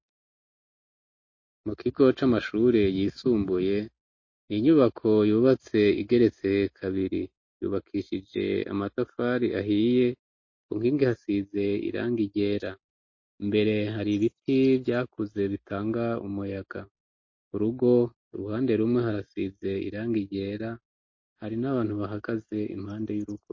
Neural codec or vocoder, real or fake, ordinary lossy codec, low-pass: none; real; MP3, 32 kbps; 7.2 kHz